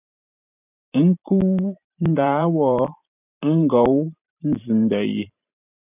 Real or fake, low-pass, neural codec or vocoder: fake; 3.6 kHz; vocoder, 44.1 kHz, 128 mel bands every 512 samples, BigVGAN v2